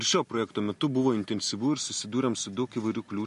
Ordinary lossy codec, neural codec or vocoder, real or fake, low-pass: MP3, 48 kbps; none; real; 14.4 kHz